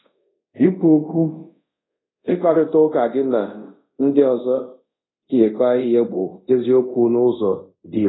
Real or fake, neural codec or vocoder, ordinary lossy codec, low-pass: fake; codec, 24 kHz, 0.5 kbps, DualCodec; AAC, 16 kbps; 7.2 kHz